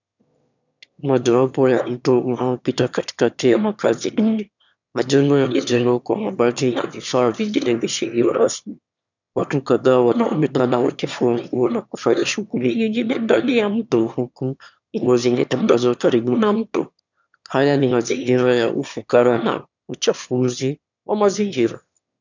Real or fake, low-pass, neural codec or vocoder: fake; 7.2 kHz; autoencoder, 22.05 kHz, a latent of 192 numbers a frame, VITS, trained on one speaker